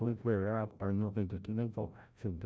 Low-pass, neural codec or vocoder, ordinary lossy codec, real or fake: none; codec, 16 kHz, 0.5 kbps, FreqCodec, larger model; none; fake